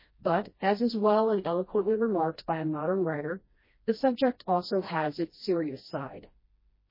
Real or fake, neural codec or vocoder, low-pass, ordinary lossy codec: fake; codec, 16 kHz, 1 kbps, FreqCodec, smaller model; 5.4 kHz; MP3, 24 kbps